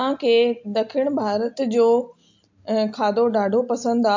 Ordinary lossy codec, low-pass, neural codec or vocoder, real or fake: MP3, 48 kbps; 7.2 kHz; none; real